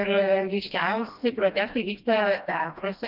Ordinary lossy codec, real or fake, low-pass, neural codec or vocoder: Opus, 32 kbps; fake; 5.4 kHz; codec, 16 kHz, 1 kbps, FreqCodec, smaller model